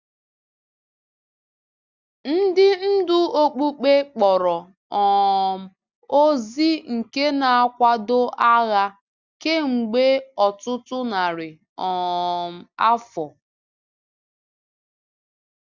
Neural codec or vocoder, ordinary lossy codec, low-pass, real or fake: none; Opus, 64 kbps; 7.2 kHz; real